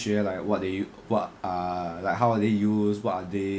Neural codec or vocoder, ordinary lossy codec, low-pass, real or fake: none; none; none; real